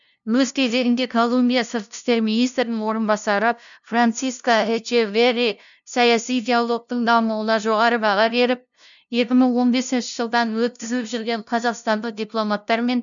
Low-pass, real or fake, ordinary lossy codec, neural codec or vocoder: 7.2 kHz; fake; none; codec, 16 kHz, 0.5 kbps, FunCodec, trained on LibriTTS, 25 frames a second